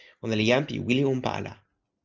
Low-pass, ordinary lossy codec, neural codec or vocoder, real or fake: 7.2 kHz; Opus, 24 kbps; none; real